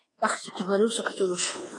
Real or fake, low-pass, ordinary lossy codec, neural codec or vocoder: fake; 10.8 kHz; AAC, 32 kbps; codec, 24 kHz, 1.2 kbps, DualCodec